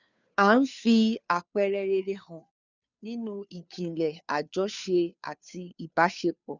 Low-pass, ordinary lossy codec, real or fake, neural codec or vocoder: 7.2 kHz; none; fake; codec, 16 kHz, 2 kbps, FunCodec, trained on Chinese and English, 25 frames a second